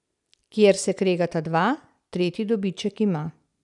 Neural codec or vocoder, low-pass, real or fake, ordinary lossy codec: none; 10.8 kHz; real; none